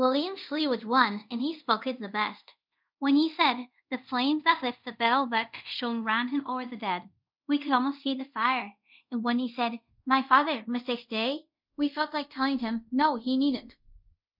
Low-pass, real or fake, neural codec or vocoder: 5.4 kHz; fake; codec, 24 kHz, 0.5 kbps, DualCodec